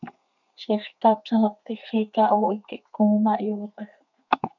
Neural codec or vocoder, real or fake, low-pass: codec, 32 kHz, 1.9 kbps, SNAC; fake; 7.2 kHz